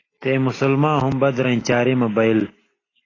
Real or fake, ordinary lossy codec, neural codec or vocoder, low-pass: real; AAC, 32 kbps; none; 7.2 kHz